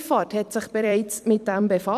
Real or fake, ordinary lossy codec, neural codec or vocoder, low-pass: fake; none; vocoder, 44.1 kHz, 128 mel bands every 256 samples, BigVGAN v2; 14.4 kHz